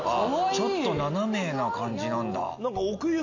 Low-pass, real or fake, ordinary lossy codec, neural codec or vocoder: 7.2 kHz; real; AAC, 48 kbps; none